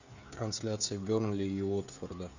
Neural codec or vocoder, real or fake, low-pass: codec, 16 kHz, 16 kbps, FreqCodec, smaller model; fake; 7.2 kHz